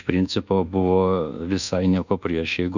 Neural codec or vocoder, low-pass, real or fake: codec, 24 kHz, 1.2 kbps, DualCodec; 7.2 kHz; fake